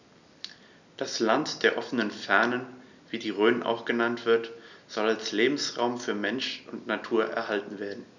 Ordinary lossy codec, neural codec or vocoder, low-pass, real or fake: none; none; 7.2 kHz; real